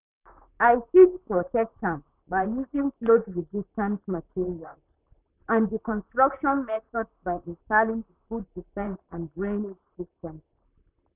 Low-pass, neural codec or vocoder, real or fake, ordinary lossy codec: 3.6 kHz; vocoder, 44.1 kHz, 128 mel bands, Pupu-Vocoder; fake; none